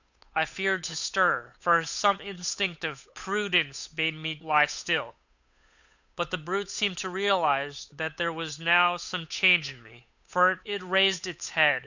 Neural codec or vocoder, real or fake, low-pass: codec, 16 kHz, 8 kbps, FunCodec, trained on Chinese and English, 25 frames a second; fake; 7.2 kHz